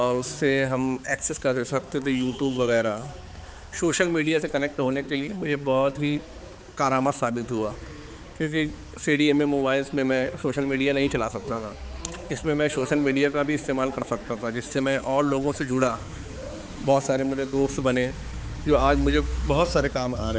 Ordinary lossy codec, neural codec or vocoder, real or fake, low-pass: none; codec, 16 kHz, 4 kbps, X-Codec, HuBERT features, trained on balanced general audio; fake; none